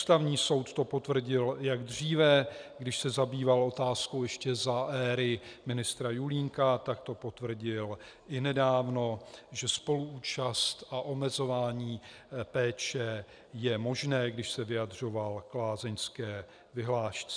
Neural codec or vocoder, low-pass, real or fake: none; 9.9 kHz; real